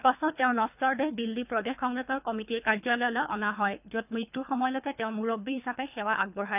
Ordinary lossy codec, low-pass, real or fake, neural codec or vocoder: none; 3.6 kHz; fake; codec, 24 kHz, 3 kbps, HILCodec